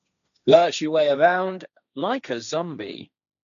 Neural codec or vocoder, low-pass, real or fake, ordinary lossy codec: codec, 16 kHz, 1.1 kbps, Voila-Tokenizer; 7.2 kHz; fake; none